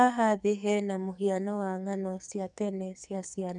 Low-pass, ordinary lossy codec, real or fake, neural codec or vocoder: 10.8 kHz; none; fake; codec, 44.1 kHz, 2.6 kbps, SNAC